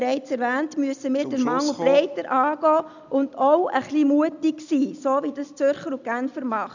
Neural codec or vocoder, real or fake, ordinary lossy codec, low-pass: none; real; none; 7.2 kHz